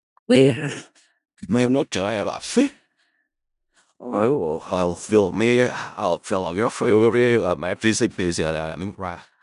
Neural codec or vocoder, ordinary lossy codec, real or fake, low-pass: codec, 16 kHz in and 24 kHz out, 0.4 kbps, LongCat-Audio-Codec, four codebook decoder; none; fake; 10.8 kHz